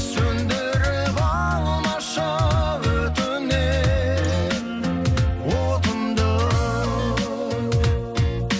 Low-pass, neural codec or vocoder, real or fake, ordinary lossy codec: none; none; real; none